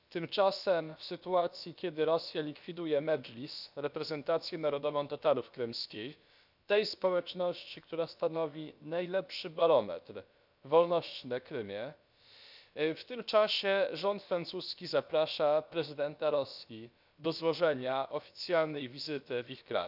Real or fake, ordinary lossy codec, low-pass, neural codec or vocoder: fake; none; 5.4 kHz; codec, 16 kHz, about 1 kbps, DyCAST, with the encoder's durations